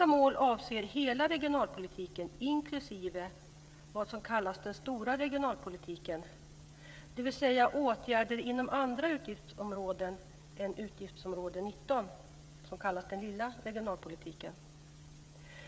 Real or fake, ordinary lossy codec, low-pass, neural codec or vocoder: fake; none; none; codec, 16 kHz, 16 kbps, FreqCodec, smaller model